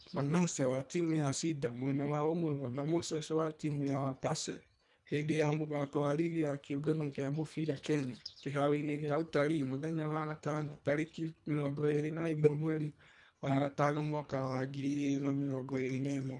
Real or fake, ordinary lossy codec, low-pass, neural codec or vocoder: fake; none; 10.8 kHz; codec, 24 kHz, 1.5 kbps, HILCodec